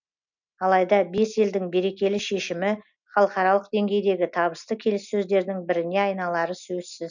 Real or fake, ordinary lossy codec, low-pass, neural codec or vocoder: real; none; 7.2 kHz; none